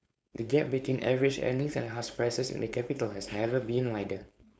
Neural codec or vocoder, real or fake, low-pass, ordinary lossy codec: codec, 16 kHz, 4.8 kbps, FACodec; fake; none; none